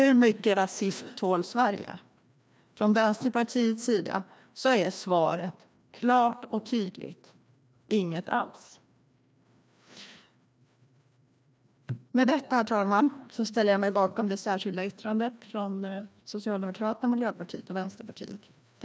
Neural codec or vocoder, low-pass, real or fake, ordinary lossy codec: codec, 16 kHz, 1 kbps, FreqCodec, larger model; none; fake; none